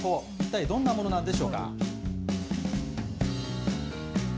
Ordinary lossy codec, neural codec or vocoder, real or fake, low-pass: none; none; real; none